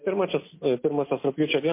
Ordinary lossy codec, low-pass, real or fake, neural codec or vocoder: MP3, 24 kbps; 3.6 kHz; real; none